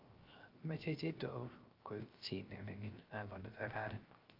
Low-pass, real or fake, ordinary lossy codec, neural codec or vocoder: 5.4 kHz; fake; Opus, 32 kbps; codec, 16 kHz, 0.3 kbps, FocalCodec